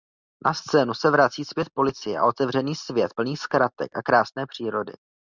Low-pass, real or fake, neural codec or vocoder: 7.2 kHz; real; none